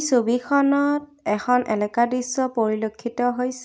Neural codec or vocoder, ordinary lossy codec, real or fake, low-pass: none; none; real; none